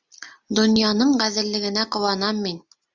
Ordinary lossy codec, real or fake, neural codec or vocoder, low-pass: Opus, 64 kbps; real; none; 7.2 kHz